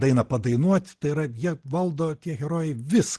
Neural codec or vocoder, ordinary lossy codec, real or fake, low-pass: none; Opus, 16 kbps; real; 10.8 kHz